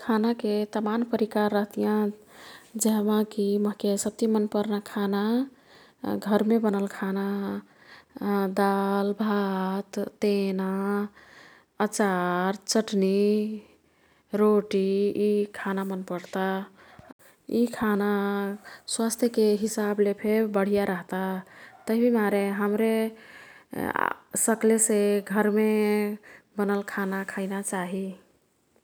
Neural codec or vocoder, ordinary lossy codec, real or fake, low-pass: none; none; real; none